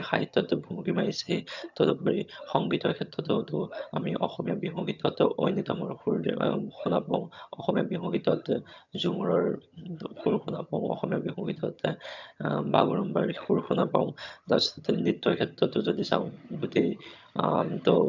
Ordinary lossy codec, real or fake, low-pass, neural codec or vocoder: none; fake; 7.2 kHz; vocoder, 22.05 kHz, 80 mel bands, HiFi-GAN